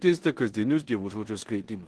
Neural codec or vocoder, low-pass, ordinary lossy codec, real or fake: codec, 16 kHz in and 24 kHz out, 0.9 kbps, LongCat-Audio-Codec, four codebook decoder; 10.8 kHz; Opus, 16 kbps; fake